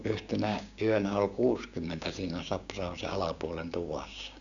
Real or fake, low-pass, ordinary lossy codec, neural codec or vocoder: fake; 7.2 kHz; none; codec, 16 kHz, 6 kbps, DAC